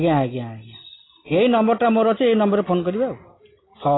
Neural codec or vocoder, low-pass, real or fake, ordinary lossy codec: none; 7.2 kHz; real; AAC, 16 kbps